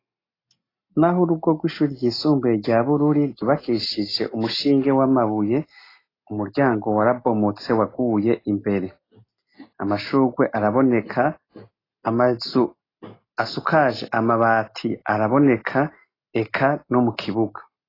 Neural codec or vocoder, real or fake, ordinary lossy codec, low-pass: none; real; AAC, 24 kbps; 5.4 kHz